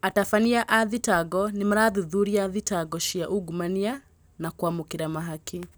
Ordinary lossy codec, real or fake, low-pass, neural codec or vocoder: none; real; none; none